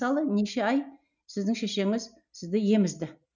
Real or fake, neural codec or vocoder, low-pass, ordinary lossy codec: real; none; 7.2 kHz; none